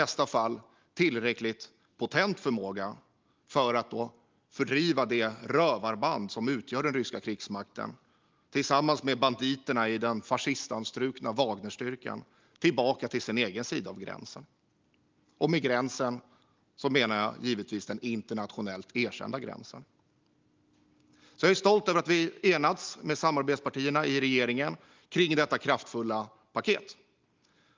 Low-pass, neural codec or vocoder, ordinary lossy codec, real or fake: 7.2 kHz; none; Opus, 32 kbps; real